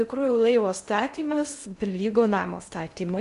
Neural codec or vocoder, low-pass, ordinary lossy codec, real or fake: codec, 16 kHz in and 24 kHz out, 0.6 kbps, FocalCodec, streaming, 2048 codes; 10.8 kHz; MP3, 64 kbps; fake